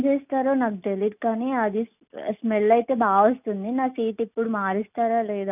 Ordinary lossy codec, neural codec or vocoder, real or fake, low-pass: none; none; real; 3.6 kHz